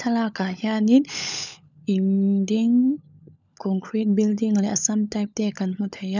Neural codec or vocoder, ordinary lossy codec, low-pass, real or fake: codec, 16 kHz, 16 kbps, FunCodec, trained on LibriTTS, 50 frames a second; none; 7.2 kHz; fake